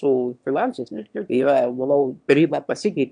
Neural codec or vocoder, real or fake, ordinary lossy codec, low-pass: autoencoder, 22.05 kHz, a latent of 192 numbers a frame, VITS, trained on one speaker; fake; MP3, 64 kbps; 9.9 kHz